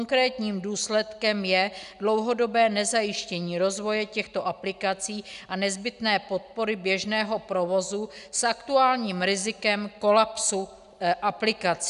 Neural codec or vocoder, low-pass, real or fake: none; 10.8 kHz; real